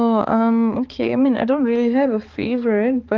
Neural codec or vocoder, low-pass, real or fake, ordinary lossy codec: codec, 16 kHz, 4 kbps, X-Codec, HuBERT features, trained on balanced general audio; 7.2 kHz; fake; Opus, 32 kbps